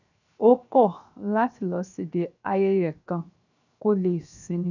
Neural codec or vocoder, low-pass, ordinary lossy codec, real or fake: codec, 16 kHz, 0.7 kbps, FocalCodec; 7.2 kHz; none; fake